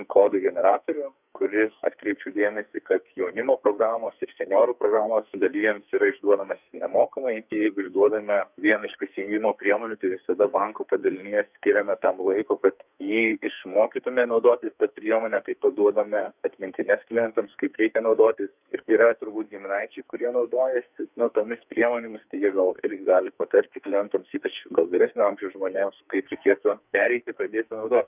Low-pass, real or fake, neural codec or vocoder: 3.6 kHz; fake; codec, 44.1 kHz, 2.6 kbps, SNAC